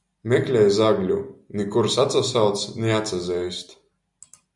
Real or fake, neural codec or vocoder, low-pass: real; none; 10.8 kHz